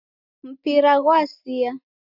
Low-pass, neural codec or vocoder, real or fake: 5.4 kHz; none; real